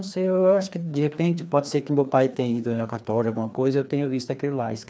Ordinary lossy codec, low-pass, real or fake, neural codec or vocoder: none; none; fake; codec, 16 kHz, 2 kbps, FreqCodec, larger model